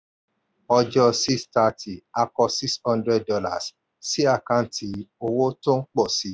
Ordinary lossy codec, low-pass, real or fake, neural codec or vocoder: none; none; real; none